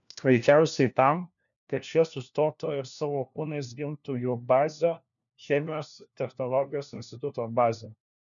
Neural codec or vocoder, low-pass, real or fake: codec, 16 kHz, 1 kbps, FunCodec, trained on LibriTTS, 50 frames a second; 7.2 kHz; fake